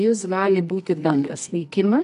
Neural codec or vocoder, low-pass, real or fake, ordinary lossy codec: codec, 24 kHz, 0.9 kbps, WavTokenizer, medium music audio release; 10.8 kHz; fake; AAC, 96 kbps